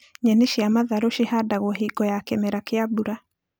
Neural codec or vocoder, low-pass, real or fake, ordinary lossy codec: none; none; real; none